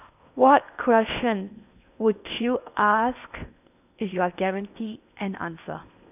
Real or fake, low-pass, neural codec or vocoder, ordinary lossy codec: fake; 3.6 kHz; codec, 16 kHz in and 24 kHz out, 0.8 kbps, FocalCodec, streaming, 65536 codes; none